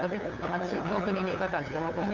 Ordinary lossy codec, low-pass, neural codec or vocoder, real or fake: none; 7.2 kHz; codec, 16 kHz, 8 kbps, FunCodec, trained on LibriTTS, 25 frames a second; fake